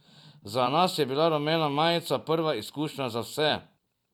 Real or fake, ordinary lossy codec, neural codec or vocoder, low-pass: fake; none; vocoder, 44.1 kHz, 128 mel bands every 256 samples, BigVGAN v2; 19.8 kHz